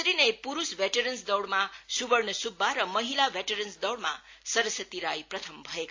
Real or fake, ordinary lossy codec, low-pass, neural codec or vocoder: real; AAC, 48 kbps; 7.2 kHz; none